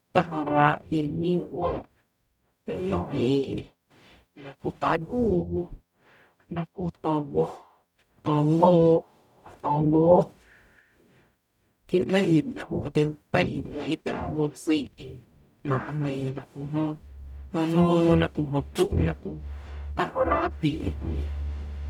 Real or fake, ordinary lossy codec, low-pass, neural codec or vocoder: fake; none; 19.8 kHz; codec, 44.1 kHz, 0.9 kbps, DAC